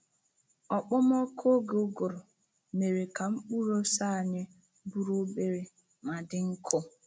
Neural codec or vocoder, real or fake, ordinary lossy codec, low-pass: none; real; none; none